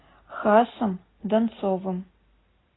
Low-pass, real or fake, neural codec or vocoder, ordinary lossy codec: 7.2 kHz; real; none; AAC, 16 kbps